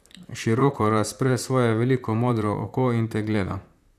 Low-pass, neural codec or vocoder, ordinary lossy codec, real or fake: 14.4 kHz; vocoder, 44.1 kHz, 128 mel bands, Pupu-Vocoder; none; fake